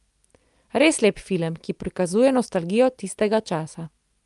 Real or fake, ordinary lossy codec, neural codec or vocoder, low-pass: real; Opus, 32 kbps; none; 10.8 kHz